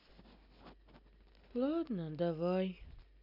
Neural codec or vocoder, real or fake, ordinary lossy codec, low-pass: none; real; none; 5.4 kHz